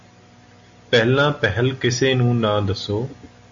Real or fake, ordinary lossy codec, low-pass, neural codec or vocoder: real; AAC, 48 kbps; 7.2 kHz; none